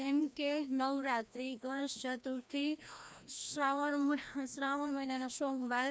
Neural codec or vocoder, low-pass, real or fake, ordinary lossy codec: codec, 16 kHz, 1 kbps, FreqCodec, larger model; none; fake; none